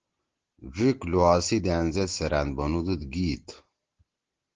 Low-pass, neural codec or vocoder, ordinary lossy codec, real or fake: 7.2 kHz; none; Opus, 16 kbps; real